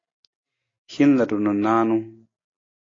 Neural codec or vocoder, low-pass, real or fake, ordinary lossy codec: none; 7.2 kHz; real; AAC, 32 kbps